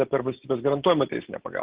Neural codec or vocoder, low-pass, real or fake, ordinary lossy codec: none; 3.6 kHz; real; Opus, 16 kbps